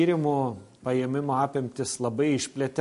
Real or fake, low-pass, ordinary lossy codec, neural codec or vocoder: real; 10.8 kHz; MP3, 48 kbps; none